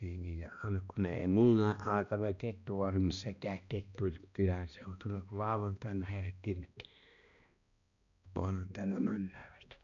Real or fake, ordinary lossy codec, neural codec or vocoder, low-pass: fake; none; codec, 16 kHz, 1 kbps, X-Codec, HuBERT features, trained on balanced general audio; 7.2 kHz